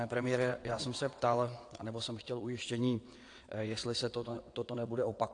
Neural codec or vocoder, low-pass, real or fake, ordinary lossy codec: vocoder, 22.05 kHz, 80 mel bands, WaveNeXt; 9.9 kHz; fake; AAC, 48 kbps